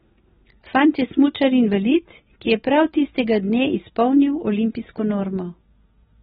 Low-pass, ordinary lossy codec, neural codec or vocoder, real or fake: 19.8 kHz; AAC, 16 kbps; none; real